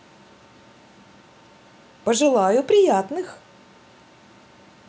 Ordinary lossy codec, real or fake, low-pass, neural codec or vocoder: none; real; none; none